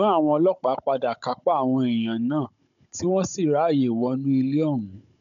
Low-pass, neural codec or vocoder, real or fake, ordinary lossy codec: 7.2 kHz; codec, 16 kHz, 16 kbps, FunCodec, trained on Chinese and English, 50 frames a second; fake; none